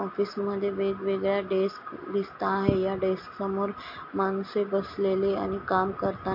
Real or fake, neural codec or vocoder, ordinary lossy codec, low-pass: real; none; MP3, 32 kbps; 5.4 kHz